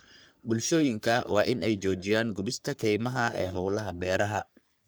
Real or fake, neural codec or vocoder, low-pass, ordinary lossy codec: fake; codec, 44.1 kHz, 3.4 kbps, Pupu-Codec; none; none